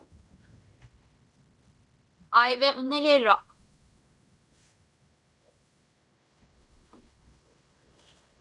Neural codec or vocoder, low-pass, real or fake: codec, 16 kHz in and 24 kHz out, 0.9 kbps, LongCat-Audio-Codec, fine tuned four codebook decoder; 10.8 kHz; fake